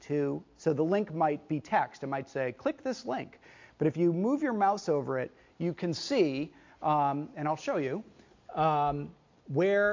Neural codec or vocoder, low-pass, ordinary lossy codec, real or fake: none; 7.2 kHz; MP3, 48 kbps; real